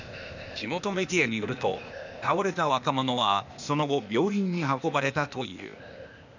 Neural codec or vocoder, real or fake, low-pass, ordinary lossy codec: codec, 16 kHz, 0.8 kbps, ZipCodec; fake; 7.2 kHz; none